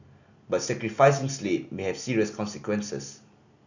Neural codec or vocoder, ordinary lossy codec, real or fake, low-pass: none; none; real; 7.2 kHz